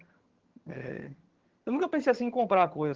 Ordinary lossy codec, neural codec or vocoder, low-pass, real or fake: Opus, 16 kbps; vocoder, 22.05 kHz, 80 mel bands, HiFi-GAN; 7.2 kHz; fake